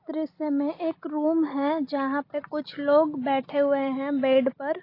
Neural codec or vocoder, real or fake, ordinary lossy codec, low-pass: none; real; AAC, 24 kbps; 5.4 kHz